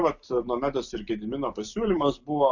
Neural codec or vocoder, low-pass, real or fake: none; 7.2 kHz; real